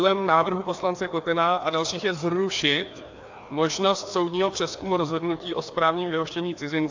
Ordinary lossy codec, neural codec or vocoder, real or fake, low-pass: AAC, 48 kbps; codec, 16 kHz, 2 kbps, FreqCodec, larger model; fake; 7.2 kHz